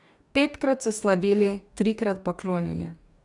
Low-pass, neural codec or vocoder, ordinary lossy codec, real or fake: 10.8 kHz; codec, 44.1 kHz, 2.6 kbps, DAC; none; fake